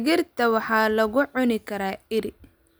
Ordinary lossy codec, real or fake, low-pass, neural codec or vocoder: none; real; none; none